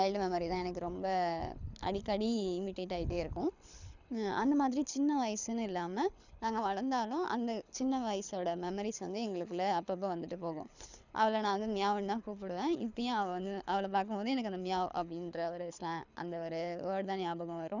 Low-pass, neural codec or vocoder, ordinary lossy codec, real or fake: 7.2 kHz; codec, 24 kHz, 6 kbps, HILCodec; none; fake